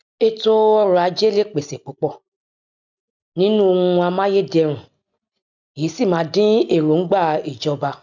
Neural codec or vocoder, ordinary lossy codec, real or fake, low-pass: none; none; real; 7.2 kHz